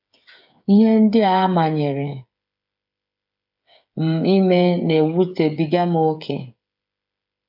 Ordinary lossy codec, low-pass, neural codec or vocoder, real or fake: AAC, 48 kbps; 5.4 kHz; codec, 16 kHz, 8 kbps, FreqCodec, smaller model; fake